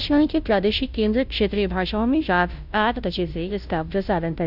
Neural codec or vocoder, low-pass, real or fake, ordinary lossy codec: codec, 16 kHz, 0.5 kbps, FunCodec, trained on Chinese and English, 25 frames a second; 5.4 kHz; fake; none